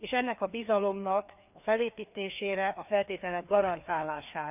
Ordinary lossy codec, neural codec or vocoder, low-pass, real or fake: none; codec, 16 kHz, 2 kbps, FreqCodec, larger model; 3.6 kHz; fake